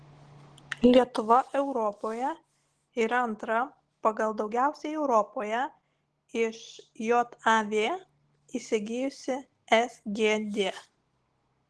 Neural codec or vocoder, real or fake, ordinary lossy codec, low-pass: none; real; Opus, 16 kbps; 10.8 kHz